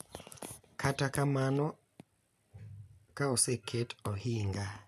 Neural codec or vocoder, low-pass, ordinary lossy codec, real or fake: none; 14.4 kHz; none; real